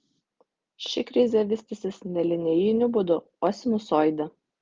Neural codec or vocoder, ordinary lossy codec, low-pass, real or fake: none; Opus, 32 kbps; 7.2 kHz; real